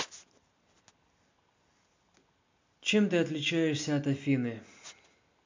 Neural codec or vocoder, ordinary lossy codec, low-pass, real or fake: none; MP3, 48 kbps; 7.2 kHz; real